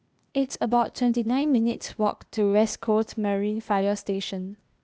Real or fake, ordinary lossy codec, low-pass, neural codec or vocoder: fake; none; none; codec, 16 kHz, 0.8 kbps, ZipCodec